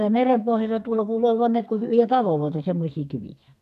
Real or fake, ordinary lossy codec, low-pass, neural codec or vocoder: fake; none; 14.4 kHz; codec, 32 kHz, 1.9 kbps, SNAC